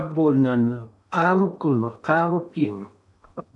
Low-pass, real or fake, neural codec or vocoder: 10.8 kHz; fake; codec, 16 kHz in and 24 kHz out, 0.8 kbps, FocalCodec, streaming, 65536 codes